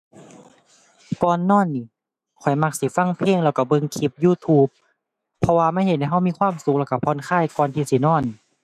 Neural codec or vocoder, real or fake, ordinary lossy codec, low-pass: autoencoder, 48 kHz, 128 numbers a frame, DAC-VAE, trained on Japanese speech; fake; none; 14.4 kHz